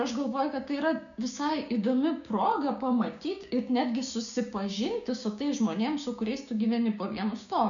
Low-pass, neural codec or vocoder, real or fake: 7.2 kHz; codec, 16 kHz, 6 kbps, DAC; fake